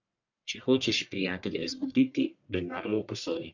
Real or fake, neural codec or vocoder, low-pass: fake; codec, 44.1 kHz, 1.7 kbps, Pupu-Codec; 7.2 kHz